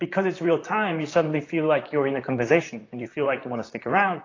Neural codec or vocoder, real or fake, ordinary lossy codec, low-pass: vocoder, 44.1 kHz, 128 mel bands, Pupu-Vocoder; fake; AAC, 32 kbps; 7.2 kHz